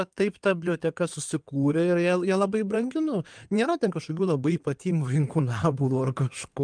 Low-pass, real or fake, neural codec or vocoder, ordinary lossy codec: 9.9 kHz; fake; codec, 16 kHz in and 24 kHz out, 2.2 kbps, FireRedTTS-2 codec; Opus, 32 kbps